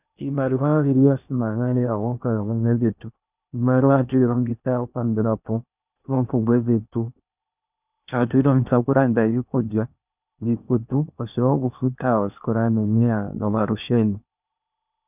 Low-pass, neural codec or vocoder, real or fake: 3.6 kHz; codec, 16 kHz in and 24 kHz out, 0.8 kbps, FocalCodec, streaming, 65536 codes; fake